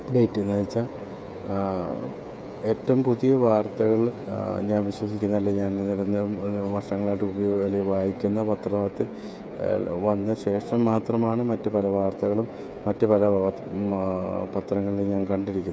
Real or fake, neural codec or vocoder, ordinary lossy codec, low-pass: fake; codec, 16 kHz, 8 kbps, FreqCodec, smaller model; none; none